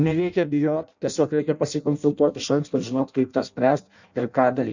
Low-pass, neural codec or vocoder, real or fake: 7.2 kHz; codec, 16 kHz in and 24 kHz out, 0.6 kbps, FireRedTTS-2 codec; fake